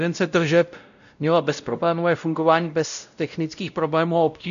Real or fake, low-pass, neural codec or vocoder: fake; 7.2 kHz; codec, 16 kHz, 0.5 kbps, X-Codec, WavLM features, trained on Multilingual LibriSpeech